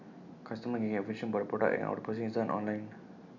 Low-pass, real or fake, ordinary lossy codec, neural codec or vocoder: 7.2 kHz; real; none; none